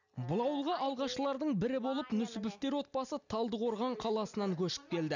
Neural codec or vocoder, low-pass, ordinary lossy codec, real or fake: none; 7.2 kHz; none; real